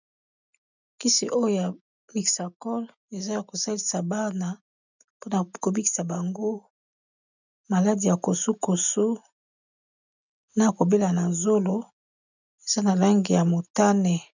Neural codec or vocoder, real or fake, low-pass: none; real; 7.2 kHz